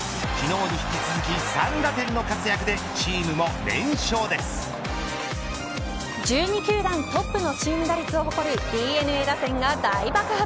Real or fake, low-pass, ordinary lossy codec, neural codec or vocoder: real; none; none; none